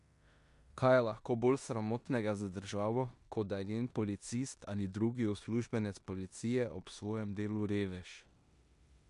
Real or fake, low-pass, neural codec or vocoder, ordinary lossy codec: fake; 10.8 kHz; codec, 16 kHz in and 24 kHz out, 0.9 kbps, LongCat-Audio-Codec, four codebook decoder; MP3, 64 kbps